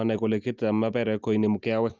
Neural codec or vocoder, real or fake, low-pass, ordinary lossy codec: none; real; 7.2 kHz; Opus, 24 kbps